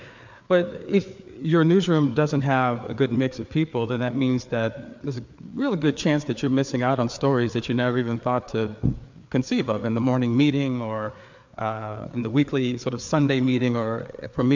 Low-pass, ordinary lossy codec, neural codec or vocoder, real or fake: 7.2 kHz; MP3, 64 kbps; codec, 16 kHz, 4 kbps, FreqCodec, larger model; fake